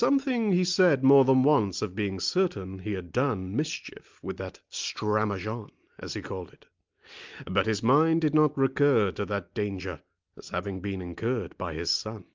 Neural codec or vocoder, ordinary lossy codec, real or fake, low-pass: none; Opus, 24 kbps; real; 7.2 kHz